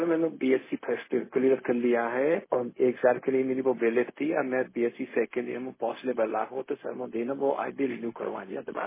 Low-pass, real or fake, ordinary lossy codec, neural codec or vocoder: 3.6 kHz; fake; MP3, 16 kbps; codec, 16 kHz, 0.4 kbps, LongCat-Audio-Codec